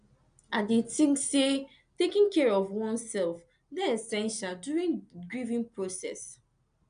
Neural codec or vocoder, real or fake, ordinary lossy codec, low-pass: none; real; none; 9.9 kHz